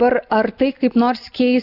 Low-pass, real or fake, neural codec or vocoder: 5.4 kHz; real; none